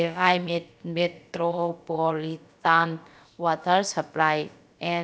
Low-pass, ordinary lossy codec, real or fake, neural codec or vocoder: none; none; fake; codec, 16 kHz, about 1 kbps, DyCAST, with the encoder's durations